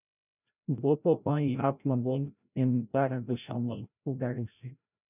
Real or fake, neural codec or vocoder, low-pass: fake; codec, 16 kHz, 0.5 kbps, FreqCodec, larger model; 3.6 kHz